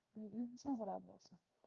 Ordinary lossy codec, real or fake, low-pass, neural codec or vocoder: Opus, 24 kbps; fake; 7.2 kHz; codec, 16 kHz, 0.7 kbps, FocalCodec